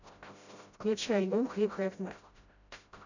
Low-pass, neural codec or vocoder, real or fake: 7.2 kHz; codec, 16 kHz, 0.5 kbps, FreqCodec, smaller model; fake